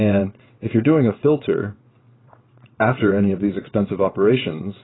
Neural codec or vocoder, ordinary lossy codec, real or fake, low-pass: vocoder, 22.05 kHz, 80 mel bands, WaveNeXt; AAC, 16 kbps; fake; 7.2 kHz